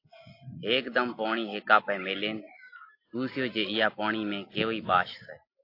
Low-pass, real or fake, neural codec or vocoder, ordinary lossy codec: 5.4 kHz; real; none; AAC, 32 kbps